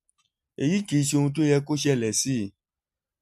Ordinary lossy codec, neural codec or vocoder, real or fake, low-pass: MP3, 64 kbps; none; real; 14.4 kHz